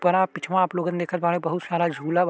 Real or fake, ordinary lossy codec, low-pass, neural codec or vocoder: real; none; none; none